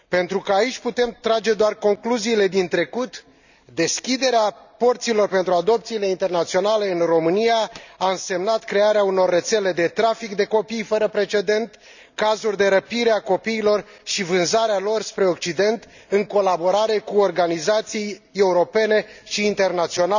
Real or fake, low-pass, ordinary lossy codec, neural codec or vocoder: real; 7.2 kHz; none; none